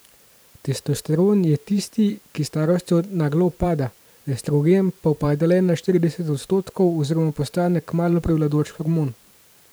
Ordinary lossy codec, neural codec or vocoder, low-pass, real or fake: none; vocoder, 44.1 kHz, 128 mel bands every 512 samples, BigVGAN v2; none; fake